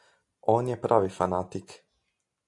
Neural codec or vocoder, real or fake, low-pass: none; real; 10.8 kHz